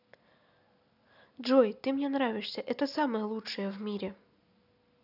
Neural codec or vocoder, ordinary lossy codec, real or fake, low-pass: none; none; real; 5.4 kHz